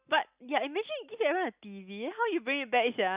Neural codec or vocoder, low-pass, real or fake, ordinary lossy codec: none; 3.6 kHz; real; Opus, 64 kbps